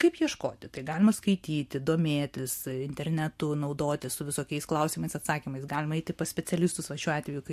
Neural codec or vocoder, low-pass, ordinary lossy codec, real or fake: none; 14.4 kHz; MP3, 64 kbps; real